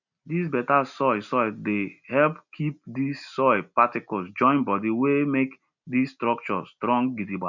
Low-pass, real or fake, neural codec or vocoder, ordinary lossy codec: 7.2 kHz; real; none; none